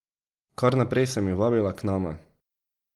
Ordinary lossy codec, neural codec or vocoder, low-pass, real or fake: Opus, 24 kbps; none; 19.8 kHz; real